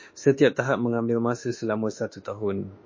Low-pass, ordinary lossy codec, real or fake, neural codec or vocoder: 7.2 kHz; MP3, 32 kbps; fake; autoencoder, 48 kHz, 32 numbers a frame, DAC-VAE, trained on Japanese speech